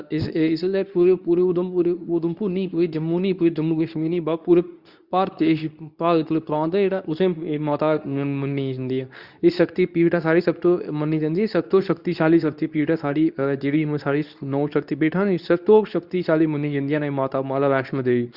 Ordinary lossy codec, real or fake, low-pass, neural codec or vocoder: Opus, 64 kbps; fake; 5.4 kHz; codec, 24 kHz, 0.9 kbps, WavTokenizer, medium speech release version 2